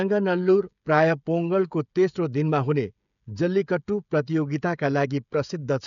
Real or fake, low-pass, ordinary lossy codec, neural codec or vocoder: fake; 7.2 kHz; MP3, 96 kbps; codec, 16 kHz, 16 kbps, FreqCodec, smaller model